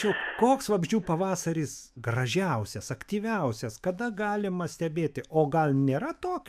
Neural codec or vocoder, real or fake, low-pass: autoencoder, 48 kHz, 128 numbers a frame, DAC-VAE, trained on Japanese speech; fake; 14.4 kHz